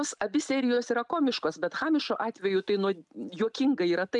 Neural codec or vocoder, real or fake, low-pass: none; real; 10.8 kHz